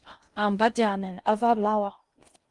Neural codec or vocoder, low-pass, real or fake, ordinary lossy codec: codec, 16 kHz in and 24 kHz out, 0.6 kbps, FocalCodec, streaming, 2048 codes; 10.8 kHz; fake; Opus, 32 kbps